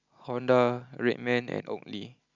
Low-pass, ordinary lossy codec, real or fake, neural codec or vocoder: 7.2 kHz; Opus, 64 kbps; real; none